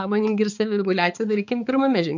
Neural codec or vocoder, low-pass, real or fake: codec, 16 kHz, 4 kbps, X-Codec, HuBERT features, trained on balanced general audio; 7.2 kHz; fake